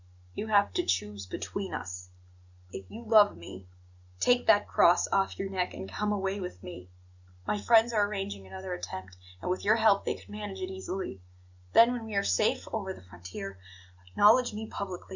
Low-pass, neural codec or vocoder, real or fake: 7.2 kHz; none; real